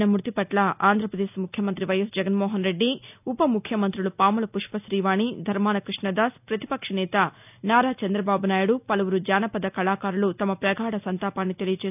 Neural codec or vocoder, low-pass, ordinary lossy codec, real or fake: none; 3.6 kHz; none; real